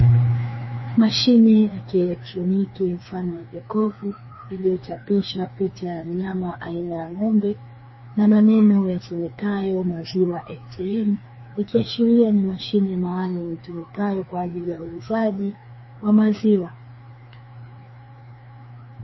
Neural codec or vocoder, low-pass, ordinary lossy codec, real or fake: codec, 16 kHz, 2 kbps, FreqCodec, larger model; 7.2 kHz; MP3, 24 kbps; fake